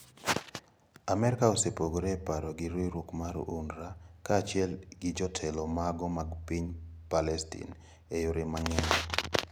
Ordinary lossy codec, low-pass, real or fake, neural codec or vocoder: none; none; real; none